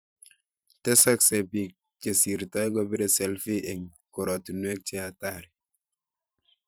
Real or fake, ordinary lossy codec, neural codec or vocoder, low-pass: fake; none; vocoder, 44.1 kHz, 128 mel bands every 512 samples, BigVGAN v2; none